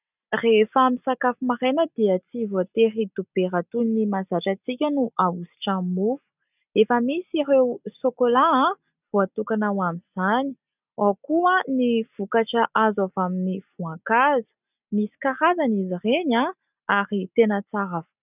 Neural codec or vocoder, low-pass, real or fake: none; 3.6 kHz; real